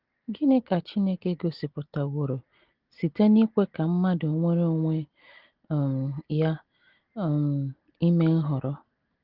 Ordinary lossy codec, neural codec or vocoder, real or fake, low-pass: Opus, 16 kbps; none; real; 5.4 kHz